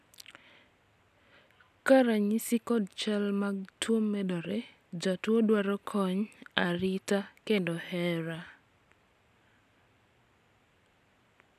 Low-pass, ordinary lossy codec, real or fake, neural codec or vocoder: 14.4 kHz; none; real; none